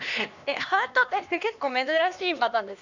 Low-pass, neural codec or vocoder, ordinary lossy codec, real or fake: 7.2 kHz; codec, 16 kHz, 2 kbps, X-Codec, HuBERT features, trained on LibriSpeech; none; fake